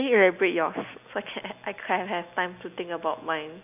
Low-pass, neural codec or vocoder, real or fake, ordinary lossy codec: 3.6 kHz; none; real; none